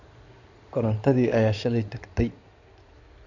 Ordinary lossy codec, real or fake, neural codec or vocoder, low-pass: none; fake; codec, 16 kHz in and 24 kHz out, 2.2 kbps, FireRedTTS-2 codec; 7.2 kHz